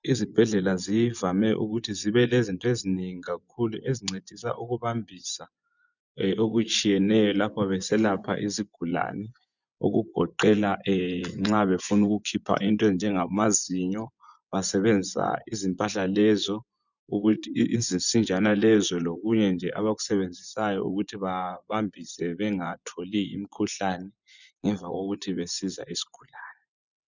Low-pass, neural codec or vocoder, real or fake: 7.2 kHz; vocoder, 24 kHz, 100 mel bands, Vocos; fake